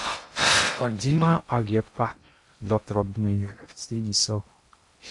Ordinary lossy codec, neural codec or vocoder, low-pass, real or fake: MP3, 64 kbps; codec, 16 kHz in and 24 kHz out, 0.6 kbps, FocalCodec, streaming, 2048 codes; 10.8 kHz; fake